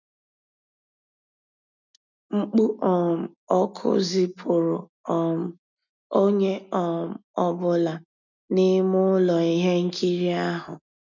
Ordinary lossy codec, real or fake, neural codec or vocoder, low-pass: none; real; none; 7.2 kHz